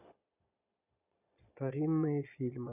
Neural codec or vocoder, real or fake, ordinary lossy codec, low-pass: vocoder, 22.05 kHz, 80 mel bands, Vocos; fake; none; 3.6 kHz